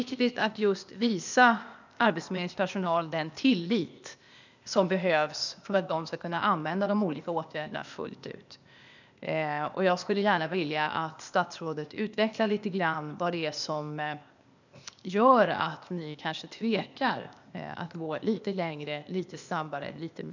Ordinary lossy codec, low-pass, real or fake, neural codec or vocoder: none; 7.2 kHz; fake; codec, 16 kHz, 0.8 kbps, ZipCodec